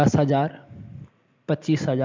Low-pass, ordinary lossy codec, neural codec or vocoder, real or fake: 7.2 kHz; MP3, 64 kbps; none; real